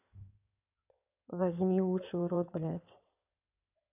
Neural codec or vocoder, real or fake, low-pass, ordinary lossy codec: codec, 16 kHz in and 24 kHz out, 2.2 kbps, FireRedTTS-2 codec; fake; 3.6 kHz; none